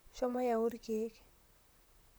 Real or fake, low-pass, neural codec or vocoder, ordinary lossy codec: fake; none; vocoder, 44.1 kHz, 128 mel bands, Pupu-Vocoder; none